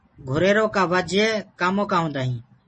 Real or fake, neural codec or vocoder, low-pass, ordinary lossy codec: real; none; 10.8 kHz; MP3, 32 kbps